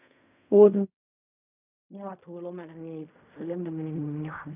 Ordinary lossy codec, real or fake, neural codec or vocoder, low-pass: none; fake; codec, 16 kHz in and 24 kHz out, 0.4 kbps, LongCat-Audio-Codec, fine tuned four codebook decoder; 3.6 kHz